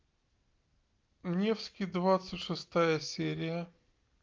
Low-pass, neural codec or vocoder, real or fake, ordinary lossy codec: 7.2 kHz; none; real; Opus, 32 kbps